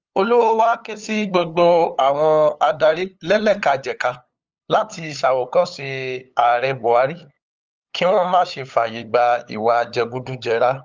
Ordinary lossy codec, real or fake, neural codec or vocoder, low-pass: Opus, 24 kbps; fake; codec, 16 kHz, 8 kbps, FunCodec, trained on LibriTTS, 25 frames a second; 7.2 kHz